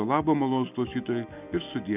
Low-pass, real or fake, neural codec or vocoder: 3.6 kHz; real; none